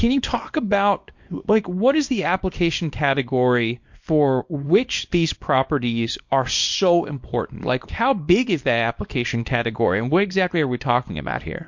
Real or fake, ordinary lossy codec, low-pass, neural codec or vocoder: fake; MP3, 48 kbps; 7.2 kHz; codec, 24 kHz, 0.9 kbps, WavTokenizer, small release